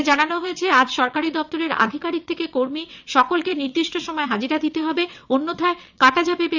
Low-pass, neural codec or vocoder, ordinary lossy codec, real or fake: 7.2 kHz; vocoder, 22.05 kHz, 80 mel bands, WaveNeXt; none; fake